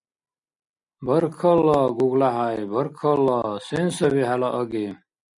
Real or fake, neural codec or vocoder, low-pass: real; none; 10.8 kHz